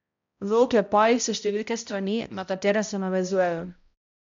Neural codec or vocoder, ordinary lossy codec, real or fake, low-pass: codec, 16 kHz, 0.5 kbps, X-Codec, HuBERT features, trained on balanced general audio; MP3, 64 kbps; fake; 7.2 kHz